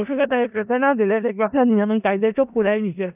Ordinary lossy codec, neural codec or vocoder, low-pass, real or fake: none; codec, 16 kHz in and 24 kHz out, 0.4 kbps, LongCat-Audio-Codec, four codebook decoder; 3.6 kHz; fake